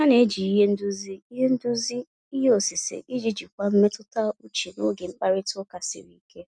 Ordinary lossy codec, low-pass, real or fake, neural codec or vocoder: none; none; real; none